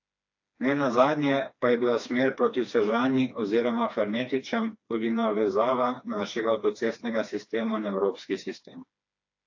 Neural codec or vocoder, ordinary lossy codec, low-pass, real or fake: codec, 16 kHz, 2 kbps, FreqCodec, smaller model; AAC, 48 kbps; 7.2 kHz; fake